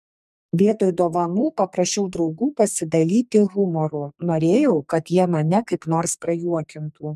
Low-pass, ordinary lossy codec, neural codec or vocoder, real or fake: 14.4 kHz; MP3, 96 kbps; codec, 44.1 kHz, 2.6 kbps, SNAC; fake